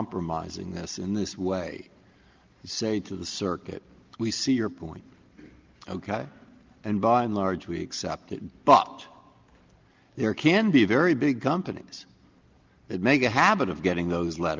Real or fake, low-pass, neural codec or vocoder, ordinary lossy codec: real; 7.2 kHz; none; Opus, 32 kbps